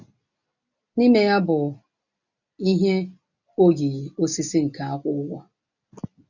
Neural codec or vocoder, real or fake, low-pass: none; real; 7.2 kHz